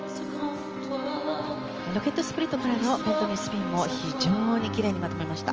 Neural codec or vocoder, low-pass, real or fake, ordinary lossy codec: none; 7.2 kHz; real; Opus, 24 kbps